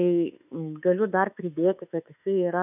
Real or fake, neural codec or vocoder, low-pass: fake; autoencoder, 48 kHz, 32 numbers a frame, DAC-VAE, trained on Japanese speech; 3.6 kHz